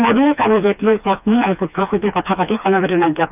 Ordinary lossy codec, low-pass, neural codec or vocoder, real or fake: none; 3.6 kHz; codec, 16 kHz, 2 kbps, FreqCodec, smaller model; fake